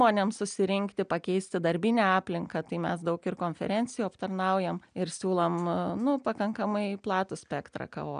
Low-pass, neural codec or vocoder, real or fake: 9.9 kHz; none; real